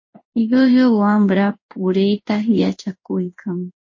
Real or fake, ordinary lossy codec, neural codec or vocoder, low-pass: fake; MP3, 32 kbps; codec, 16 kHz in and 24 kHz out, 1 kbps, XY-Tokenizer; 7.2 kHz